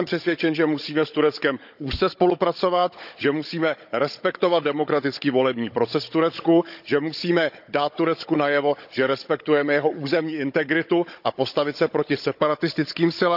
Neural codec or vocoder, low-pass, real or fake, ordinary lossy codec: codec, 16 kHz, 16 kbps, FunCodec, trained on Chinese and English, 50 frames a second; 5.4 kHz; fake; none